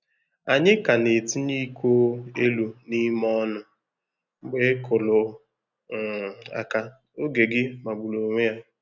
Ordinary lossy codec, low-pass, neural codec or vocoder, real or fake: none; 7.2 kHz; none; real